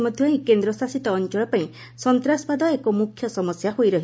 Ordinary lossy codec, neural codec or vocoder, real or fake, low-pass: none; none; real; none